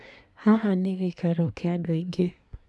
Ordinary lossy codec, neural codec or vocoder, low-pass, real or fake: none; codec, 24 kHz, 1 kbps, SNAC; none; fake